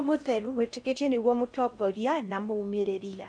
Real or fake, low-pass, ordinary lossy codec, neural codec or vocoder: fake; 9.9 kHz; none; codec, 16 kHz in and 24 kHz out, 0.6 kbps, FocalCodec, streaming, 4096 codes